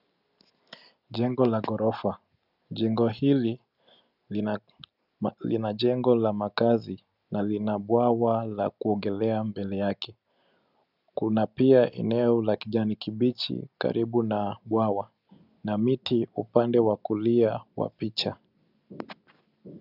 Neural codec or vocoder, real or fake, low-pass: none; real; 5.4 kHz